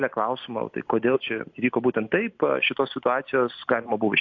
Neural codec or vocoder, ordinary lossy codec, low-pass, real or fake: none; AAC, 48 kbps; 7.2 kHz; real